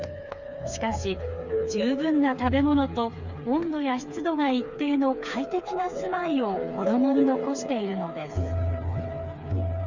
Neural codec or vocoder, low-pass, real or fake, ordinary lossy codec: codec, 16 kHz, 4 kbps, FreqCodec, smaller model; 7.2 kHz; fake; Opus, 64 kbps